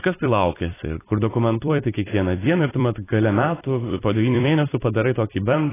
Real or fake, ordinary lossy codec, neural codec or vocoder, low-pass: fake; AAC, 16 kbps; vocoder, 44.1 kHz, 128 mel bands every 256 samples, BigVGAN v2; 3.6 kHz